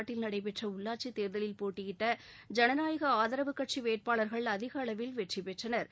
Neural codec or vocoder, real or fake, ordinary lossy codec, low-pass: none; real; none; none